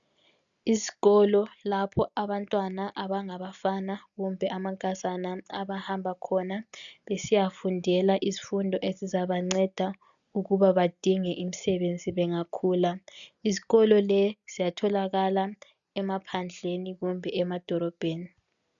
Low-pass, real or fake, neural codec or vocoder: 7.2 kHz; real; none